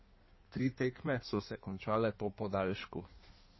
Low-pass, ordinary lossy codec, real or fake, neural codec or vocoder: 7.2 kHz; MP3, 24 kbps; fake; codec, 16 kHz in and 24 kHz out, 1.1 kbps, FireRedTTS-2 codec